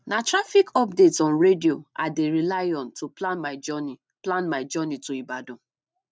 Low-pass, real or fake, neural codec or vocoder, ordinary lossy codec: none; real; none; none